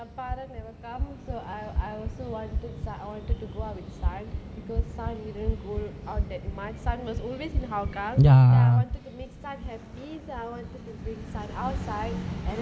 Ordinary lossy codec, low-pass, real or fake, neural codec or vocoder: none; none; real; none